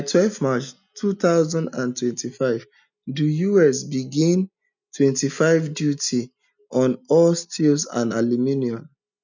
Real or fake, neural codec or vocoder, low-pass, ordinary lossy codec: real; none; 7.2 kHz; none